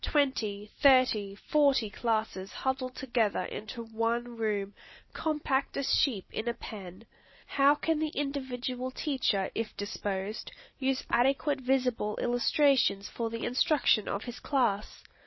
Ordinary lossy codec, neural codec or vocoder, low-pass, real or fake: MP3, 24 kbps; none; 7.2 kHz; real